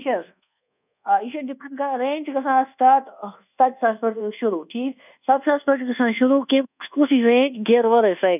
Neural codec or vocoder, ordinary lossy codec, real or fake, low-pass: codec, 24 kHz, 1.2 kbps, DualCodec; none; fake; 3.6 kHz